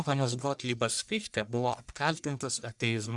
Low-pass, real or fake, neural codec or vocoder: 10.8 kHz; fake; codec, 44.1 kHz, 1.7 kbps, Pupu-Codec